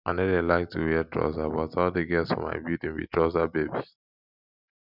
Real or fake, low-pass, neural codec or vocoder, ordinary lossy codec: real; 5.4 kHz; none; none